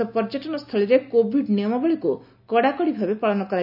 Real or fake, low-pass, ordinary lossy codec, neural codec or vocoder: real; 5.4 kHz; none; none